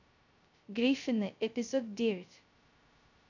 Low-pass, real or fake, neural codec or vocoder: 7.2 kHz; fake; codec, 16 kHz, 0.2 kbps, FocalCodec